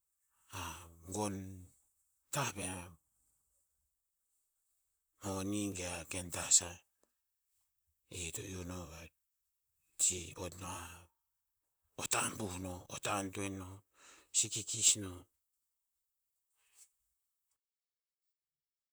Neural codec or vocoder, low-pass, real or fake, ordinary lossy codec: vocoder, 44.1 kHz, 128 mel bands, Pupu-Vocoder; none; fake; none